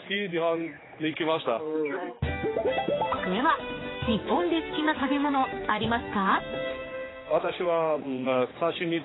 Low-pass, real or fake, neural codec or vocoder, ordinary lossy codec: 7.2 kHz; fake; codec, 16 kHz, 4 kbps, X-Codec, HuBERT features, trained on general audio; AAC, 16 kbps